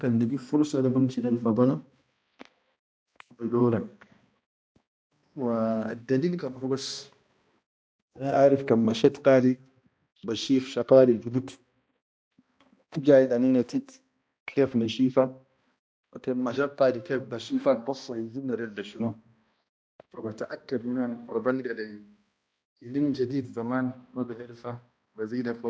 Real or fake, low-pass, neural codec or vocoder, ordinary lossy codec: fake; none; codec, 16 kHz, 1 kbps, X-Codec, HuBERT features, trained on balanced general audio; none